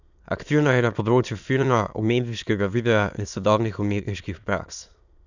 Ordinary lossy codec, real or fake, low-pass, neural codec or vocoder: none; fake; 7.2 kHz; autoencoder, 22.05 kHz, a latent of 192 numbers a frame, VITS, trained on many speakers